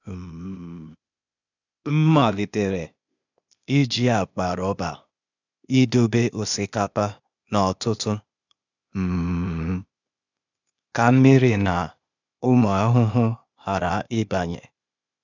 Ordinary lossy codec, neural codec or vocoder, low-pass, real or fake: none; codec, 16 kHz, 0.8 kbps, ZipCodec; 7.2 kHz; fake